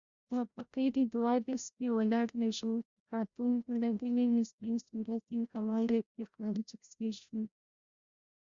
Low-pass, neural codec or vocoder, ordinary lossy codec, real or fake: 7.2 kHz; codec, 16 kHz, 0.5 kbps, FreqCodec, larger model; Opus, 64 kbps; fake